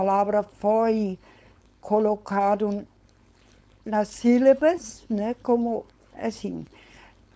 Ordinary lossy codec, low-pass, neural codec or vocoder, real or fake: none; none; codec, 16 kHz, 4.8 kbps, FACodec; fake